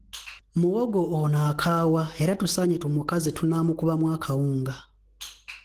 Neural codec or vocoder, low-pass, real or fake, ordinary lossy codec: autoencoder, 48 kHz, 128 numbers a frame, DAC-VAE, trained on Japanese speech; 14.4 kHz; fake; Opus, 16 kbps